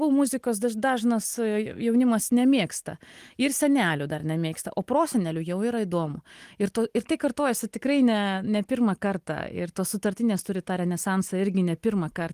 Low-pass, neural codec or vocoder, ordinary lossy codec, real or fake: 14.4 kHz; none; Opus, 24 kbps; real